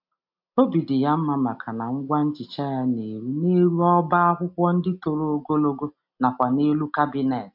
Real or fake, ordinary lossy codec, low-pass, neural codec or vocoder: real; MP3, 48 kbps; 5.4 kHz; none